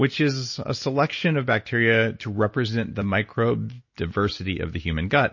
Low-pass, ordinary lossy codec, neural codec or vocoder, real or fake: 7.2 kHz; MP3, 32 kbps; none; real